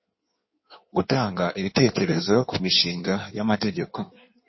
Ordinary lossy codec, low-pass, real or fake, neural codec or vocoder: MP3, 24 kbps; 7.2 kHz; fake; codec, 16 kHz in and 24 kHz out, 1.1 kbps, FireRedTTS-2 codec